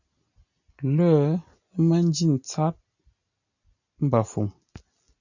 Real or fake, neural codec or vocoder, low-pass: real; none; 7.2 kHz